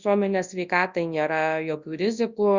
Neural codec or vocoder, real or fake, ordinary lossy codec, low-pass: codec, 24 kHz, 0.9 kbps, WavTokenizer, large speech release; fake; Opus, 64 kbps; 7.2 kHz